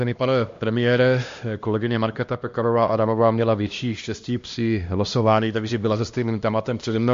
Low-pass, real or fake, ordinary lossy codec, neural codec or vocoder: 7.2 kHz; fake; MP3, 48 kbps; codec, 16 kHz, 1 kbps, X-Codec, HuBERT features, trained on LibriSpeech